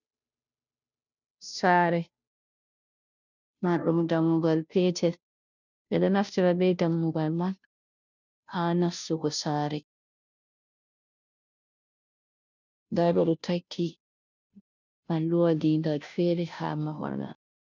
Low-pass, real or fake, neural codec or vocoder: 7.2 kHz; fake; codec, 16 kHz, 0.5 kbps, FunCodec, trained on Chinese and English, 25 frames a second